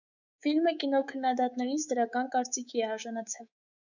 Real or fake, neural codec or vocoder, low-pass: fake; codec, 24 kHz, 3.1 kbps, DualCodec; 7.2 kHz